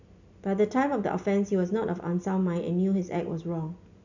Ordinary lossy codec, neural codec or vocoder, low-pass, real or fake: none; none; 7.2 kHz; real